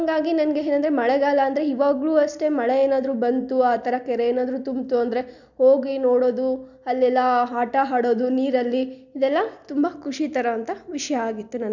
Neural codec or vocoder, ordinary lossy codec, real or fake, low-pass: none; none; real; 7.2 kHz